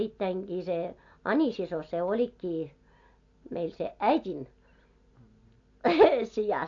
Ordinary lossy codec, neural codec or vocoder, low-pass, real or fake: AAC, 48 kbps; none; 7.2 kHz; real